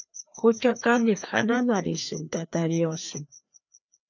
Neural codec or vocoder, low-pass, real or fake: codec, 16 kHz, 2 kbps, FreqCodec, larger model; 7.2 kHz; fake